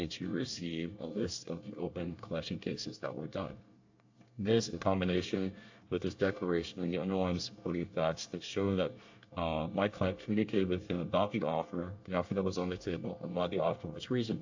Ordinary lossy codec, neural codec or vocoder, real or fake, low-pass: AAC, 48 kbps; codec, 24 kHz, 1 kbps, SNAC; fake; 7.2 kHz